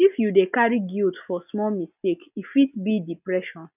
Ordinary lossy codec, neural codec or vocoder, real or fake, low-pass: none; none; real; 3.6 kHz